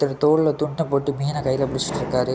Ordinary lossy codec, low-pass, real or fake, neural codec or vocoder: none; none; real; none